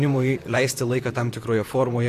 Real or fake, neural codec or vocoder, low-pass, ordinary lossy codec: fake; vocoder, 44.1 kHz, 128 mel bands, Pupu-Vocoder; 14.4 kHz; AAC, 64 kbps